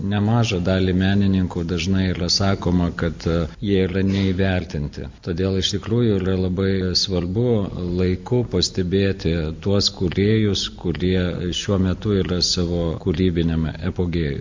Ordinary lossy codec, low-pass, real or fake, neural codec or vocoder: MP3, 48 kbps; 7.2 kHz; real; none